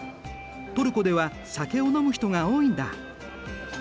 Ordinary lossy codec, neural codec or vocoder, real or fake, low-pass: none; none; real; none